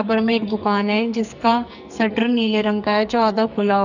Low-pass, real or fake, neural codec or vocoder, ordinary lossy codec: 7.2 kHz; fake; codec, 44.1 kHz, 2.6 kbps, SNAC; none